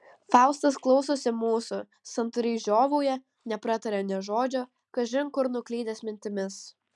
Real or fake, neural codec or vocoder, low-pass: real; none; 10.8 kHz